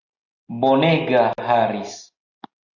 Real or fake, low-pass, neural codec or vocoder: real; 7.2 kHz; none